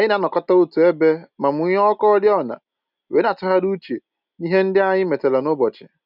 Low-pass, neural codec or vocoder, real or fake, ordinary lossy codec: 5.4 kHz; none; real; none